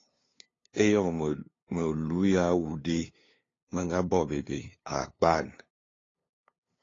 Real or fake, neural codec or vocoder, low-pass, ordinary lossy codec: fake; codec, 16 kHz, 2 kbps, FunCodec, trained on LibriTTS, 25 frames a second; 7.2 kHz; AAC, 32 kbps